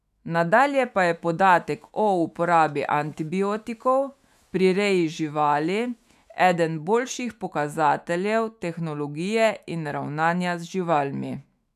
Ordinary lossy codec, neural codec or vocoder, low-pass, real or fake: none; autoencoder, 48 kHz, 128 numbers a frame, DAC-VAE, trained on Japanese speech; 14.4 kHz; fake